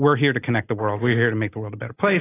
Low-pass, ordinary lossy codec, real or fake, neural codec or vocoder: 3.6 kHz; AAC, 16 kbps; real; none